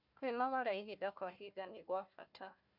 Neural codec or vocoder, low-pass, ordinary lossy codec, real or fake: codec, 16 kHz, 1 kbps, FunCodec, trained on Chinese and English, 50 frames a second; 5.4 kHz; MP3, 48 kbps; fake